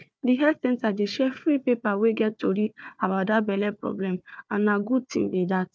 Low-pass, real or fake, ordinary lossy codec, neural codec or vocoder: none; fake; none; codec, 16 kHz, 4 kbps, FunCodec, trained on Chinese and English, 50 frames a second